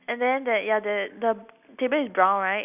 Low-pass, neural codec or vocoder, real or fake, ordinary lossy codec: 3.6 kHz; none; real; none